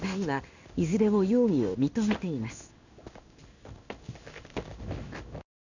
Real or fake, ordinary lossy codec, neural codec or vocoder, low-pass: fake; none; codec, 16 kHz in and 24 kHz out, 1 kbps, XY-Tokenizer; 7.2 kHz